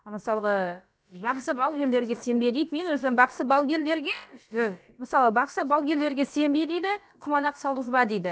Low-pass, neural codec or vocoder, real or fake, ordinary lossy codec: none; codec, 16 kHz, about 1 kbps, DyCAST, with the encoder's durations; fake; none